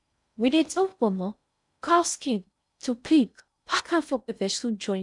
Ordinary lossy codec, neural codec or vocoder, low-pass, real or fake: none; codec, 16 kHz in and 24 kHz out, 0.6 kbps, FocalCodec, streaming, 4096 codes; 10.8 kHz; fake